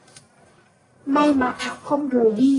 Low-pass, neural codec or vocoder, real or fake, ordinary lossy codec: 10.8 kHz; codec, 44.1 kHz, 1.7 kbps, Pupu-Codec; fake; AAC, 32 kbps